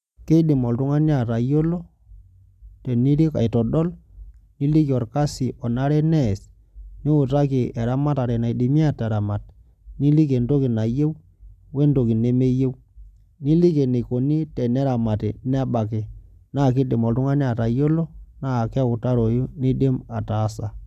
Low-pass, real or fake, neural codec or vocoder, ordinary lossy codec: 14.4 kHz; real; none; none